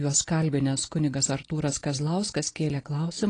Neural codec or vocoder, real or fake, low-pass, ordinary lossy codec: none; real; 9.9 kHz; AAC, 32 kbps